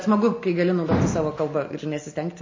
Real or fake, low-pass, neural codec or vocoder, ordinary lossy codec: real; 7.2 kHz; none; MP3, 32 kbps